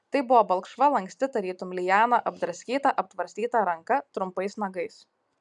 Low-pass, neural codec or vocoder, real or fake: 10.8 kHz; none; real